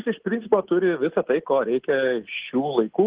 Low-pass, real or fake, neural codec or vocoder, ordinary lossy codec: 3.6 kHz; real; none; Opus, 16 kbps